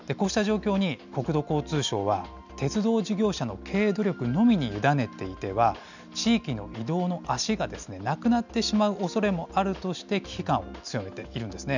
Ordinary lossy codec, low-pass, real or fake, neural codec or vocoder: none; 7.2 kHz; real; none